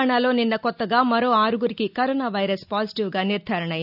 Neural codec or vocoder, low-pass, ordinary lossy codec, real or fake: none; 5.4 kHz; none; real